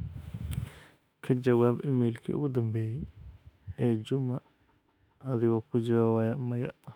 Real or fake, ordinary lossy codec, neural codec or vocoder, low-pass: fake; none; autoencoder, 48 kHz, 32 numbers a frame, DAC-VAE, trained on Japanese speech; 19.8 kHz